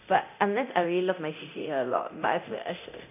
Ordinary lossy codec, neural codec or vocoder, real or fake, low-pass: none; codec, 24 kHz, 0.9 kbps, DualCodec; fake; 3.6 kHz